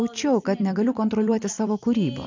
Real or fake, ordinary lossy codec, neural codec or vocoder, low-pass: fake; MP3, 64 kbps; vocoder, 44.1 kHz, 128 mel bands every 256 samples, BigVGAN v2; 7.2 kHz